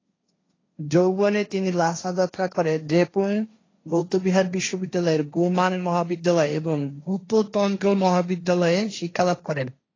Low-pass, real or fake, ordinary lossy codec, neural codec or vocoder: 7.2 kHz; fake; AAC, 32 kbps; codec, 16 kHz, 1.1 kbps, Voila-Tokenizer